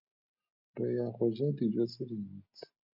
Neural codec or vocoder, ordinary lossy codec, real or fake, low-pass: none; MP3, 48 kbps; real; 5.4 kHz